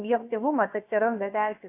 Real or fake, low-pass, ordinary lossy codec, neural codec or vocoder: fake; 3.6 kHz; AAC, 24 kbps; codec, 16 kHz, about 1 kbps, DyCAST, with the encoder's durations